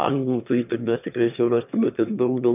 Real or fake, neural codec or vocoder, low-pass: fake; autoencoder, 22.05 kHz, a latent of 192 numbers a frame, VITS, trained on one speaker; 3.6 kHz